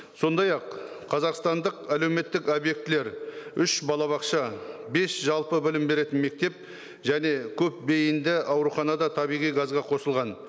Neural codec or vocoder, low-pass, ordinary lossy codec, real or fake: none; none; none; real